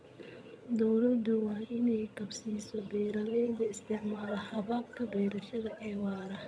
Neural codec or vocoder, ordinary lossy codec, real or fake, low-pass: vocoder, 22.05 kHz, 80 mel bands, HiFi-GAN; none; fake; none